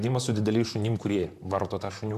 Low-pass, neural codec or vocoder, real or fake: 14.4 kHz; vocoder, 44.1 kHz, 128 mel bands, Pupu-Vocoder; fake